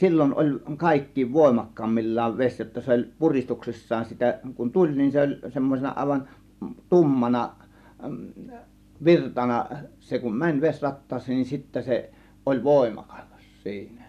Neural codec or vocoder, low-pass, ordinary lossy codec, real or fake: none; 14.4 kHz; none; real